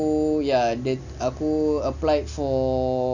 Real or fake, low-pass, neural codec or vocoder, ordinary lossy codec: real; 7.2 kHz; none; none